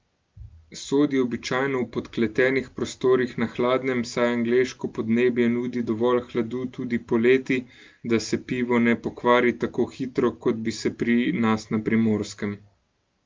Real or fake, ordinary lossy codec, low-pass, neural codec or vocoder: real; Opus, 32 kbps; 7.2 kHz; none